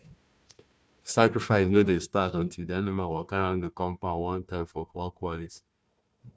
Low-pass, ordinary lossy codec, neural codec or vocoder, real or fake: none; none; codec, 16 kHz, 1 kbps, FunCodec, trained on Chinese and English, 50 frames a second; fake